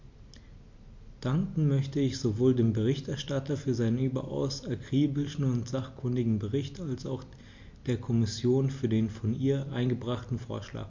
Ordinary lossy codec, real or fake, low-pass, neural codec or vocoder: MP3, 48 kbps; real; 7.2 kHz; none